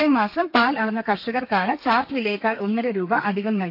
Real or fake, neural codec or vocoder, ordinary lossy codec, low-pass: fake; codec, 32 kHz, 1.9 kbps, SNAC; AAC, 48 kbps; 5.4 kHz